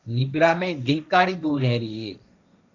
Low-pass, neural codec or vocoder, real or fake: 7.2 kHz; codec, 16 kHz, 1.1 kbps, Voila-Tokenizer; fake